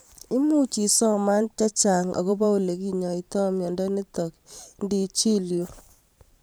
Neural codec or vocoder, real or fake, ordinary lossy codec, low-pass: vocoder, 44.1 kHz, 128 mel bands every 512 samples, BigVGAN v2; fake; none; none